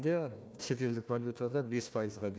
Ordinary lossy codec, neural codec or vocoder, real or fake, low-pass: none; codec, 16 kHz, 1 kbps, FunCodec, trained on Chinese and English, 50 frames a second; fake; none